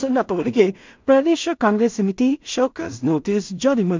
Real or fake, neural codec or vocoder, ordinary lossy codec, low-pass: fake; codec, 16 kHz in and 24 kHz out, 0.4 kbps, LongCat-Audio-Codec, two codebook decoder; MP3, 64 kbps; 7.2 kHz